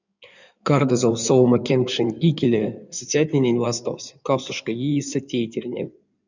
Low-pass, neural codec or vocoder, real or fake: 7.2 kHz; codec, 16 kHz in and 24 kHz out, 2.2 kbps, FireRedTTS-2 codec; fake